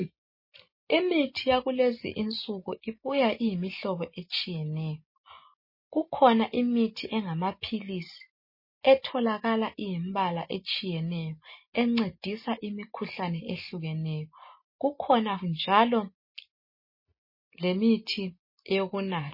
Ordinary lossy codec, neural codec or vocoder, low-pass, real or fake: MP3, 24 kbps; none; 5.4 kHz; real